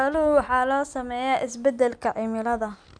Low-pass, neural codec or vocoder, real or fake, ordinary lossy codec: 9.9 kHz; none; real; none